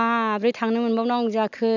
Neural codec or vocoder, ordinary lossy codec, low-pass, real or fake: none; none; 7.2 kHz; real